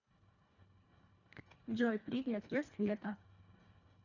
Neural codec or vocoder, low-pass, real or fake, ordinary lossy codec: codec, 24 kHz, 1.5 kbps, HILCodec; 7.2 kHz; fake; none